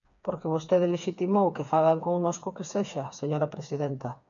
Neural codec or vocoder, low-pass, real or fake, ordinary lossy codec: codec, 16 kHz, 4 kbps, FreqCodec, smaller model; 7.2 kHz; fake; MP3, 96 kbps